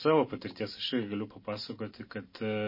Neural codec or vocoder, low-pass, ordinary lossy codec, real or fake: none; 5.4 kHz; MP3, 24 kbps; real